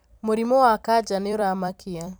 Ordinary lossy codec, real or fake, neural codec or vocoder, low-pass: none; fake; vocoder, 44.1 kHz, 128 mel bands every 256 samples, BigVGAN v2; none